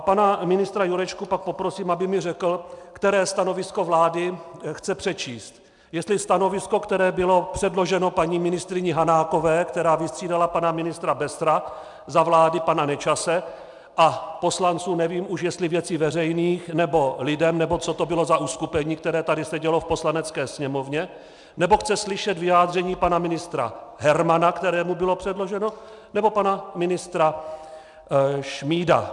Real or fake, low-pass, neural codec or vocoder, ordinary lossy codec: real; 10.8 kHz; none; MP3, 96 kbps